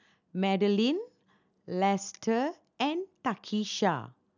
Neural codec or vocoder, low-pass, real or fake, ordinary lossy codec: none; 7.2 kHz; real; none